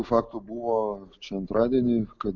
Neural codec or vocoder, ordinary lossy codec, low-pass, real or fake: none; MP3, 64 kbps; 7.2 kHz; real